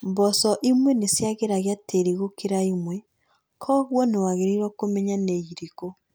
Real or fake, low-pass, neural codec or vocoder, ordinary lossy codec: real; none; none; none